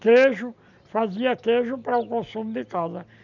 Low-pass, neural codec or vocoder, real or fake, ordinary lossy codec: 7.2 kHz; none; real; none